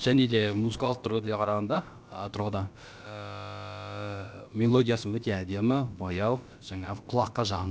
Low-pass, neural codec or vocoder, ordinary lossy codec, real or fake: none; codec, 16 kHz, about 1 kbps, DyCAST, with the encoder's durations; none; fake